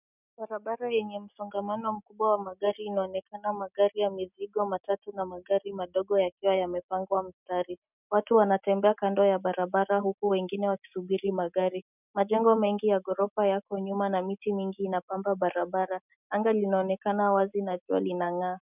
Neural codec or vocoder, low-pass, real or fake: none; 3.6 kHz; real